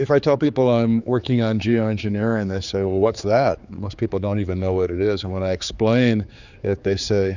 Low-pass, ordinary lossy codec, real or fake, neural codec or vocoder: 7.2 kHz; Opus, 64 kbps; fake; codec, 16 kHz, 4 kbps, X-Codec, HuBERT features, trained on general audio